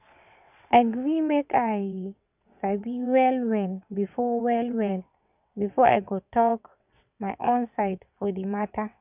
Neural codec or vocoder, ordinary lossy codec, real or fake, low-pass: vocoder, 22.05 kHz, 80 mel bands, WaveNeXt; none; fake; 3.6 kHz